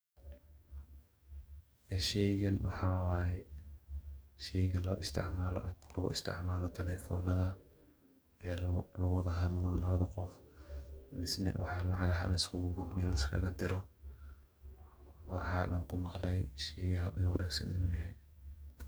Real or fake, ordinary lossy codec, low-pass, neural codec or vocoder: fake; none; none; codec, 44.1 kHz, 2.6 kbps, DAC